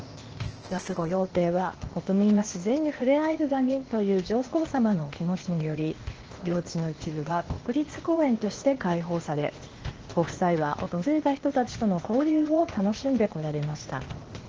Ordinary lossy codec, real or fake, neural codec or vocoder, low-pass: Opus, 16 kbps; fake; codec, 16 kHz, 0.8 kbps, ZipCodec; 7.2 kHz